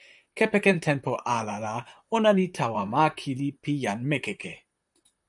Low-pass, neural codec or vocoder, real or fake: 10.8 kHz; vocoder, 44.1 kHz, 128 mel bands, Pupu-Vocoder; fake